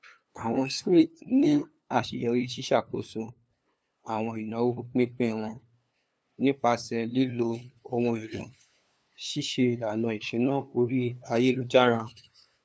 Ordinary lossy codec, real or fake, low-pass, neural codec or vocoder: none; fake; none; codec, 16 kHz, 2 kbps, FunCodec, trained on LibriTTS, 25 frames a second